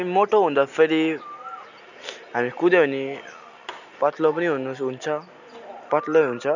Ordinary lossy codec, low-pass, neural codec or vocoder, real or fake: none; 7.2 kHz; none; real